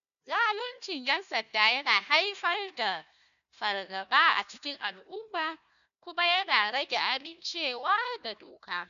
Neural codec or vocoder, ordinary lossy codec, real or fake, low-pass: codec, 16 kHz, 1 kbps, FunCodec, trained on Chinese and English, 50 frames a second; none; fake; 7.2 kHz